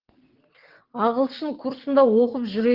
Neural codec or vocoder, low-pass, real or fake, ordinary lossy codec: vocoder, 22.05 kHz, 80 mel bands, WaveNeXt; 5.4 kHz; fake; Opus, 16 kbps